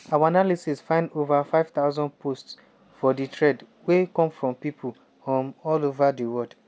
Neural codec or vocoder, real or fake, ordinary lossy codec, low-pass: none; real; none; none